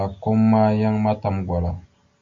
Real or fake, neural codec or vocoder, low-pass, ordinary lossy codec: real; none; 7.2 kHz; Opus, 64 kbps